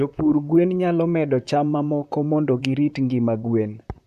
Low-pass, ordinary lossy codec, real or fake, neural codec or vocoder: 14.4 kHz; none; fake; vocoder, 44.1 kHz, 128 mel bands, Pupu-Vocoder